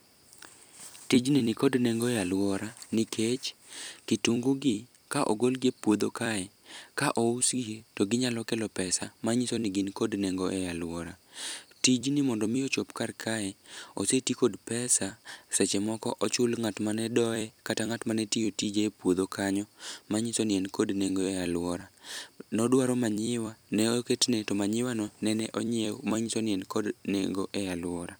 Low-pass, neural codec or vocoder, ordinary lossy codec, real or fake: none; vocoder, 44.1 kHz, 128 mel bands every 256 samples, BigVGAN v2; none; fake